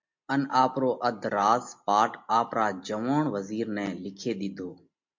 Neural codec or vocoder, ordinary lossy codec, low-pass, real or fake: none; MP3, 48 kbps; 7.2 kHz; real